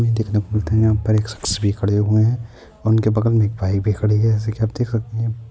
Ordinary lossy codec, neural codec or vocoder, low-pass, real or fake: none; none; none; real